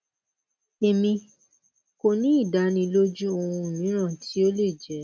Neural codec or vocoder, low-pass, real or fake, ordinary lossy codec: none; none; real; none